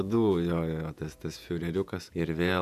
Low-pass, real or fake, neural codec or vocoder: 14.4 kHz; fake; vocoder, 48 kHz, 128 mel bands, Vocos